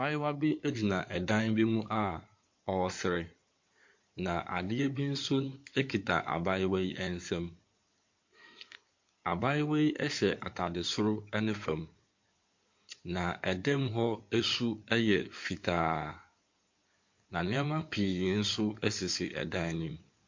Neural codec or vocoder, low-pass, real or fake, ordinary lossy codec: codec, 16 kHz in and 24 kHz out, 2.2 kbps, FireRedTTS-2 codec; 7.2 kHz; fake; MP3, 48 kbps